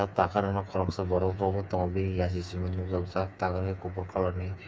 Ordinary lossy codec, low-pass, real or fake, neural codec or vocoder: none; none; fake; codec, 16 kHz, 4 kbps, FreqCodec, smaller model